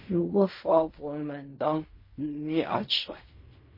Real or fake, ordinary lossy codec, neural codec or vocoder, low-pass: fake; MP3, 24 kbps; codec, 16 kHz in and 24 kHz out, 0.4 kbps, LongCat-Audio-Codec, fine tuned four codebook decoder; 5.4 kHz